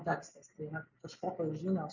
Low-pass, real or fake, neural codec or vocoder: 7.2 kHz; real; none